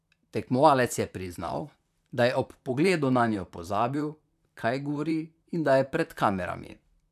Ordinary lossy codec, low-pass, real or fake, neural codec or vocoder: none; 14.4 kHz; fake; vocoder, 44.1 kHz, 128 mel bands, Pupu-Vocoder